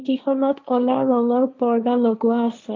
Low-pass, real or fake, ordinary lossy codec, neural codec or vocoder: 7.2 kHz; fake; MP3, 64 kbps; codec, 16 kHz, 1.1 kbps, Voila-Tokenizer